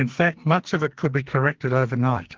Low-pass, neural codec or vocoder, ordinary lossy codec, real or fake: 7.2 kHz; codec, 44.1 kHz, 2.6 kbps, SNAC; Opus, 16 kbps; fake